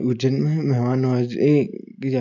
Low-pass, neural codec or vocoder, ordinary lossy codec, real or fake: 7.2 kHz; none; none; real